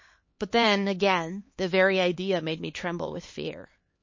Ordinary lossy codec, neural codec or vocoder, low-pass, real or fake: MP3, 32 kbps; codec, 16 kHz, 4 kbps, X-Codec, HuBERT features, trained on LibriSpeech; 7.2 kHz; fake